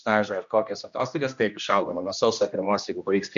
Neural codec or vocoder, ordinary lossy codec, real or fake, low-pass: codec, 16 kHz, 1 kbps, X-Codec, HuBERT features, trained on general audio; MP3, 64 kbps; fake; 7.2 kHz